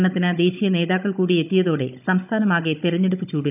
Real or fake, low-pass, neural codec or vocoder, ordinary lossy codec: fake; 3.6 kHz; codec, 16 kHz, 16 kbps, FunCodec, trained on Chinese and English, 50 frames a second; none